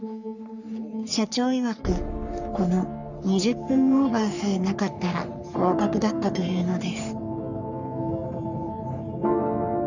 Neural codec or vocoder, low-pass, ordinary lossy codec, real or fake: codec, 44.1 kHz, 3.4 kbps, Pupu-Codec; 7.2 kHz; none; fake